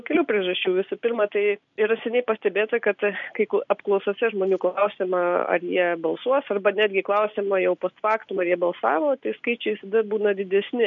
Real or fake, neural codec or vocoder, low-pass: real; none; 7.2 kHz